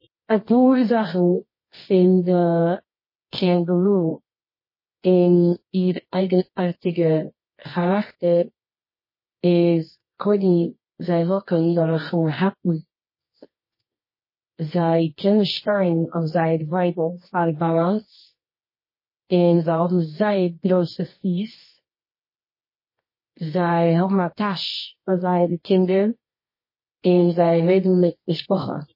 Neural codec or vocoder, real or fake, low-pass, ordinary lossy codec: codec, 24 kHz, 0.9 kbps, WavTokenizer, medium music audio release; fake; 5.4 kHz; MP3, 24 kbps